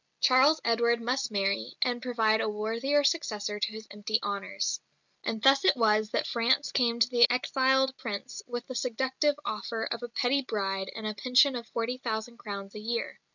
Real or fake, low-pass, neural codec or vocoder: real; 7.2 kHz; none